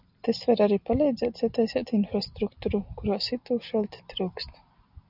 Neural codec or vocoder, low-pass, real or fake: none; 5.4 kHz; real